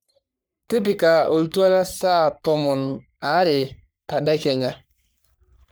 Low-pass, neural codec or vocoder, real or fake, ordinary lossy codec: none; codec, 44.1 kHz, 3.4 kbps, Pupu-Codec; fake; none